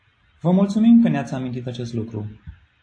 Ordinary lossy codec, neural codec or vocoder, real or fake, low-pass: AAC, 48 kbps; none; real; 9.9 kHz